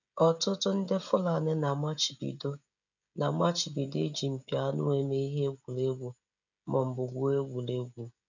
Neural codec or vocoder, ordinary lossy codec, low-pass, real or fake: codec, 16 kHz, 16 kbps, FreqCodec, smaller model; none; 7.2 kHz; fake